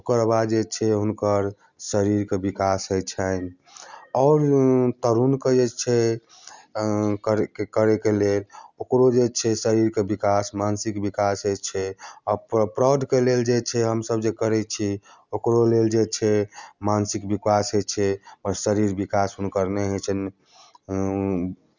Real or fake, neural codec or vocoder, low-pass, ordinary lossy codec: real; none; 7.2 kHz; none